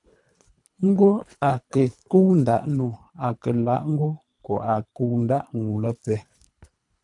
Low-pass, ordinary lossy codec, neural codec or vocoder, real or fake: 10.8 kHz; MP3, 96 kbps; codec, 24 kHz, 3 kbps, HILCodec; fake